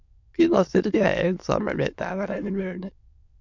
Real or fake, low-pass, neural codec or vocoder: fake; 7.2 kHz; autoencoder, 22.05 kHz, a latent of 192 numbers a frame, VITS, trained on many speakers